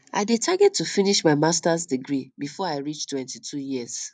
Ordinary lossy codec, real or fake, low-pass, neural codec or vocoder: none; real; 9.9 kHz; none